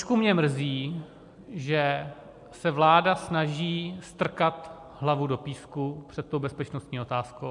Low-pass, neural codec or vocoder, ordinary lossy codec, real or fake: 10.8 kHz; none; MP3, 64 kbps; real